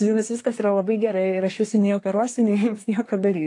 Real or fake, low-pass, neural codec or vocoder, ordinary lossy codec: fake; 10.8 kHz; codec, 24 kHz, 1 kbps, SNAC; AAC, 48 kbps